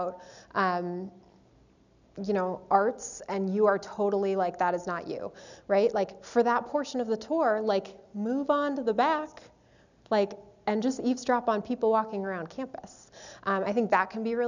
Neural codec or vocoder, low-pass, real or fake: none; 7.2 kHz; real